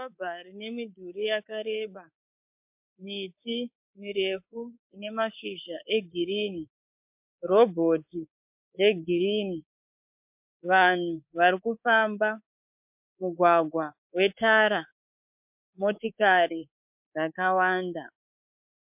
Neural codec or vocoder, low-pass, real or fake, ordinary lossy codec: codec, 24 kHz, 3.1 kbps, DualCodec; 3.6 kHz; fake; MP3, 32 kbps